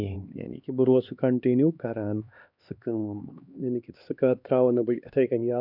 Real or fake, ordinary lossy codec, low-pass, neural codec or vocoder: fake; none; 5.4 kHz; codec, 16 kHz, 2 kbps, X-Codec, HuBERT features, trained on LibriSpeech